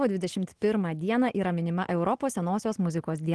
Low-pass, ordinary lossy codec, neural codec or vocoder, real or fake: 10.8 kHz; Opus, 16 kbps; none; real